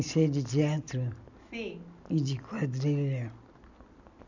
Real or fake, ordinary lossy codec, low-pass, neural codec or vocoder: real; none; 7.2 kHz; none